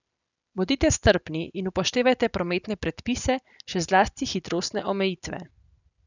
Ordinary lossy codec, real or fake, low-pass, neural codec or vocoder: none; real; 7.2 kHz; none